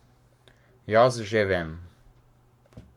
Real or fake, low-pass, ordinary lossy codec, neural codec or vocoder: fake; 19.8 kHz; Opus, 64 kbps; codec, 44.1 kHz, 7.8 kbps, Pupu-Codec